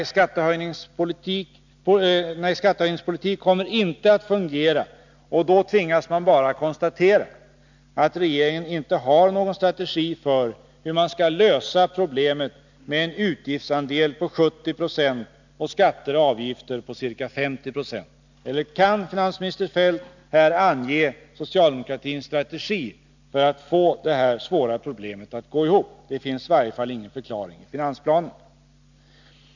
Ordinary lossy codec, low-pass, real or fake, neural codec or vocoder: none; 7.2 kHz; real; none